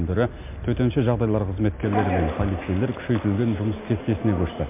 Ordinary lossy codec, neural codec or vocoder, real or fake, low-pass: MP3, 32 kbps; none; real; 3.6 kHz